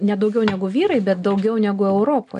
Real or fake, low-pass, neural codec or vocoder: real; 10.8 kHz; none